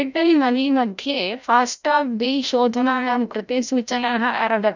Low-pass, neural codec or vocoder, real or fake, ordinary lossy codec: 7.2 kHz; codec, 16 kHz, 0.5 kbps, FreqCodec, larger model; fake; none